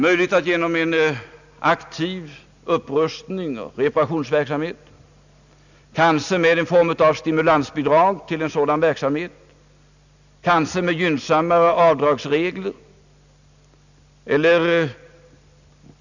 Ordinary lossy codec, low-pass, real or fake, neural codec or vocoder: none; 7.2 kHz; real; none